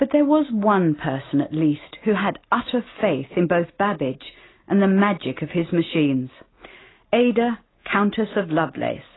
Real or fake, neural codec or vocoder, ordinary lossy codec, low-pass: real; none; AAC, 16 kbps; 7.2 kHz